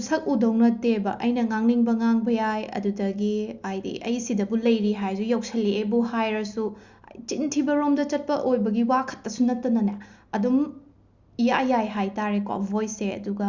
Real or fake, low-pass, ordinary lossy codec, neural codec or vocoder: real; 7.2 kHz; Opus, 64 kbps; none